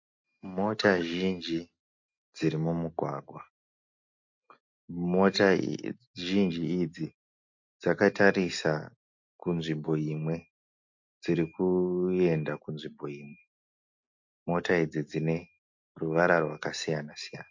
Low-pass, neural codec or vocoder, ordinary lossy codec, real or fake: 7.2 kHz; none; MP3, 48 kbps; real